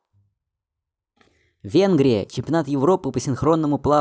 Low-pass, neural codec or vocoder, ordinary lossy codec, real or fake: none; none; none; real